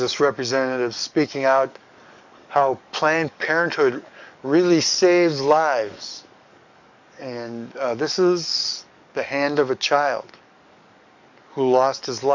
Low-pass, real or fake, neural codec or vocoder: 7.2 kHz; fake; codec, 44.1 kHz, 7.8 kbps, DAC